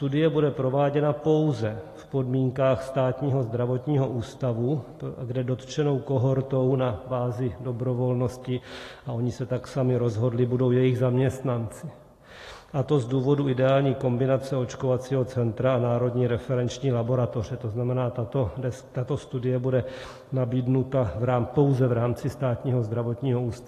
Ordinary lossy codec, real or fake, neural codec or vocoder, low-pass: AAC, 48 kbps; real; none; 14.4 kHz